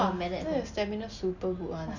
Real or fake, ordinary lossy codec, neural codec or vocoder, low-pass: real; none; none; 7.2 kHz